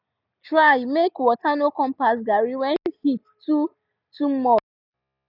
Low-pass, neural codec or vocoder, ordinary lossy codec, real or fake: 5.4 kHz; none; none; real